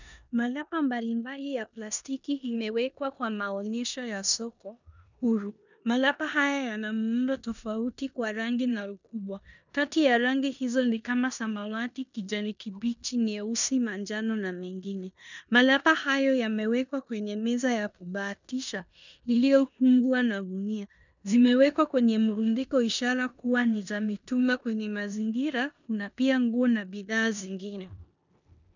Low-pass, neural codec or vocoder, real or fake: 7.2 kHz; codec, 16 kHz in and 24 kHz out, 0.9 kbps, LongCat-Audio-Codec, four codebook decoder; fake